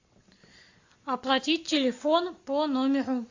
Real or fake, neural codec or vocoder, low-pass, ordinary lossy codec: real; none; 7.2 kHz; AAC, 48 kbps